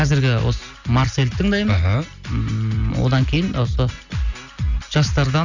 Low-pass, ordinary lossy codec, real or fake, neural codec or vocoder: 7.2 kHz; none; real; none